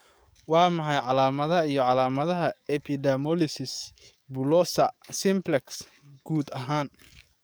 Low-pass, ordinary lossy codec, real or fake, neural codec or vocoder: none; none; fake; codec, 44.1 kHz, 7.8 kbps, DAC